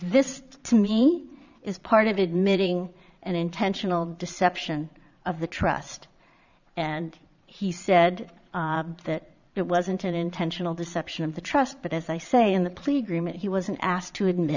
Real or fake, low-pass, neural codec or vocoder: fake; 7.2 kHz; vocoder, 22.05 kHz, 80 mel bands, Vocos